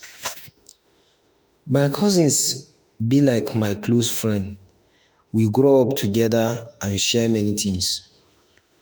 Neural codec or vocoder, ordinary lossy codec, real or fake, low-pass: autoencoder, 48 kHz, 32 numbers a frame, DAC-VAE, trained on Japanese speech; none; fake; none